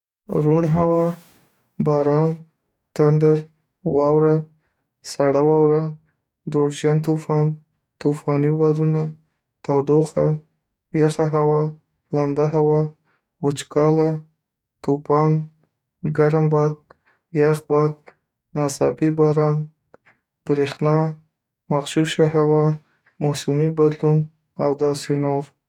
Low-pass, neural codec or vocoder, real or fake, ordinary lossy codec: 19.8 kHz; codec, 44.1 kHz, 2.6 kbps, DAC; fake; none